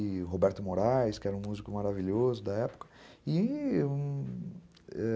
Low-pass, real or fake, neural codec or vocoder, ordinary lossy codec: none; real; none; none